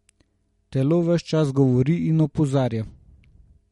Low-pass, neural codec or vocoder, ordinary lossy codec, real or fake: 19.8 kHz; none; MP3, 48 kbps; real